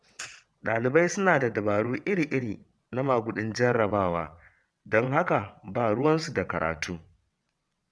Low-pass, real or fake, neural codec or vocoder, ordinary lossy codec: none; fake; vocoder, 22.05 kHz, 80 mel bands, WaveNeXt; none